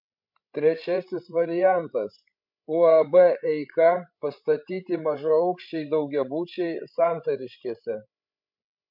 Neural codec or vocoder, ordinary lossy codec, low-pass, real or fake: codec, 16 kHz, 16 kbps, FreqCodec, larger model; MP3, 48 kbps; 5.4 kHz; fake